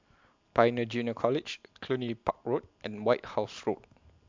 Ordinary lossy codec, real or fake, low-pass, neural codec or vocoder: MP3, 64 kbps; real; 7.2 kHz; none